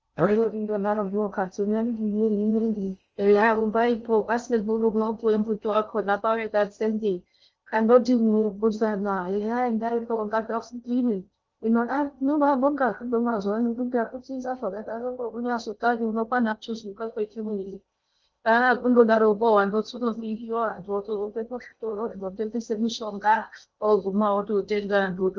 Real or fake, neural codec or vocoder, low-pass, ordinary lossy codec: fake; codec, 16 kHz in and 24 kHz out, 0.6 kbps, FocalCodec, streaming, 2048 codes; 7.2 kHz; Opus, 24 kbps